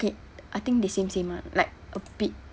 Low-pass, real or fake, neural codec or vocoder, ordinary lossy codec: none; real; none; none